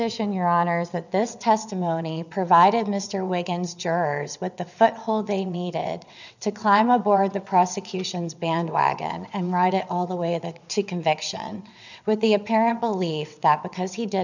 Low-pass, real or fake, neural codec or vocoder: 7.2 kHz; fake; vocoder, 22.05 kHz, 80 mel bands, WaveNeXt